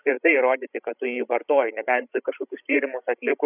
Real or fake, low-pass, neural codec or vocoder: fake; 3.6 kHz; codec, 16 kHz, 16 kbps, FreqCodec, larger model